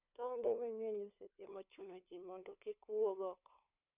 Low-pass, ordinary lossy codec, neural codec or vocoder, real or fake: 3.6 kHz; none; codec, 16 kHz, 2 kbps, FunCodec, trained on LibriTTS, 25 frames a second; fake